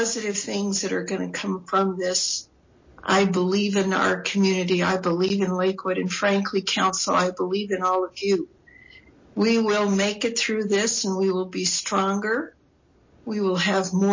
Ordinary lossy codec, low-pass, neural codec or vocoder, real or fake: MP3, 32 kbps; 7.2 kHz; none; real